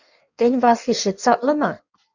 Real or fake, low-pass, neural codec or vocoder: fake; 7.2 kHz; codec, 16 kHz in and 24 kHz out, 1.1 kbps, FireRedTTS-2 codec